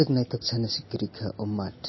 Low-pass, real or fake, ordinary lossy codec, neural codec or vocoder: 7.2 kHz; real; MP3, 24 kbps; none